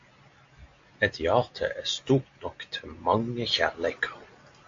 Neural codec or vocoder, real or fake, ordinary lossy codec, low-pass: none; real; AAC, 48 kbps; 7.2 kHz